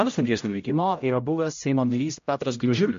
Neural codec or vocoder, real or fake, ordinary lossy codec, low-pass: codec, 16 kHz, 0.5 kbps, X-Codec, HuBERT features, trained on general audio; fake; MP3, 48 kbps; 7.2 kHz